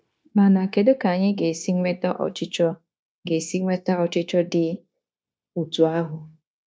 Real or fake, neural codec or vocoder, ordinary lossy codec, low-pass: fake; codec, 16 kHz, 0.9 kbps, LongCat-Audio-Codec; none; none